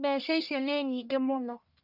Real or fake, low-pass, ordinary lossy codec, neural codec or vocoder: fake; 5.4 kHz; none; codec, 44.1 kHz, 1.7 kbps, Pupu-Codec